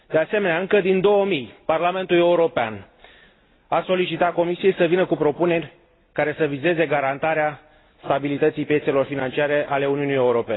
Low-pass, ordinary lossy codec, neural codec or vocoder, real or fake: 7.2 kHz; AAC, 16 kbps; none; real